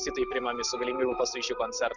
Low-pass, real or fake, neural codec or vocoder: 7.2 kHz; real; none